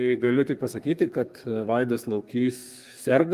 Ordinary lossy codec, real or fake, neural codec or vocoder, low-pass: Opus, 32 kbps; fake; codec, 32 kHz, 1.9 kbps, SNAC; 14.4 kHz